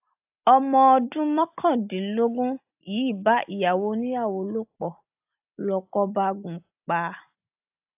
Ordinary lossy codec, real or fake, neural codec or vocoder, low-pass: AAC, 32 kbps; real; none; 3.6 kHz